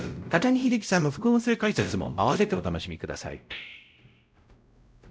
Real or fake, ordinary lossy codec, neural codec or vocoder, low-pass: fake; none; codec, 16 kHz, 0.5 kbps, X-Codec, WavLM features, trained on Multilingual LibriSpeech; none